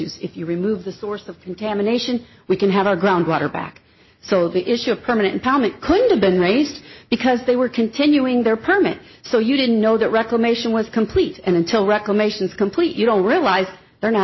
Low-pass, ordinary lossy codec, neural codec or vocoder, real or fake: 7.2 kHz; MP3, 24 kbps; none; real